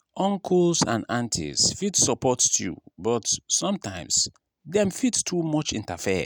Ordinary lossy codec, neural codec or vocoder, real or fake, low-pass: none; none; real; none